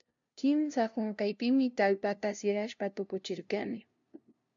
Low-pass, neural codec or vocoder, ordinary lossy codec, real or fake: 7.2 kHz; codec, 16 kHz, 0.5 kbps, FunCodec, trained on LibriTTS, 25 frames a second; MP3, 64 kbps; fake